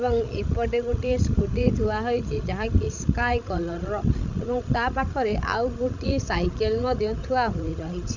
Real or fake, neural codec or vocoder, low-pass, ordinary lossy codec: fake; codec, 16 kHz, 16 kbps, FreqCodec, larger model; 7.2 kHz; none